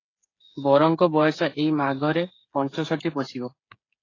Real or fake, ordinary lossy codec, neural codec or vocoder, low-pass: fake; AAC, 32 kbps; codec, 16 kHz, 8 kbps, FreqCodec, smaller model; 7.2 kHz